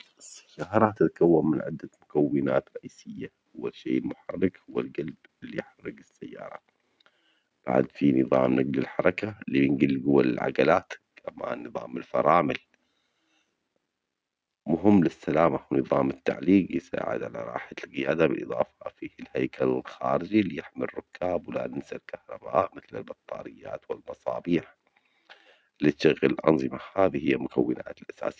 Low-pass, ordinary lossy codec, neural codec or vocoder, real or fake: none; none; none; real